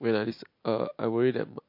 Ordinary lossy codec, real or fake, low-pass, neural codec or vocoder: MP3, 32 kbps; real; 5.4 kHz; none